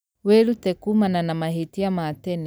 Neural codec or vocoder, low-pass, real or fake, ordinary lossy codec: none; none; real; none